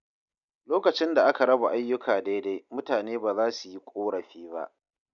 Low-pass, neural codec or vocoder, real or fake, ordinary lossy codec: 7.2 kHz; none; real; none